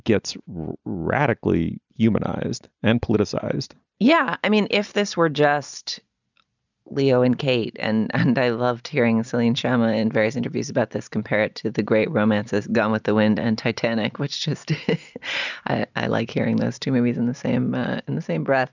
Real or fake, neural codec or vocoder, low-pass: real; none; 7.2 kHz